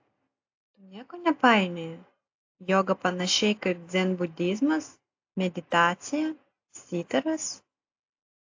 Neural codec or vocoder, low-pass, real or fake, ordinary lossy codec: none; 7.2 kHz; real; AAC, 48 kbps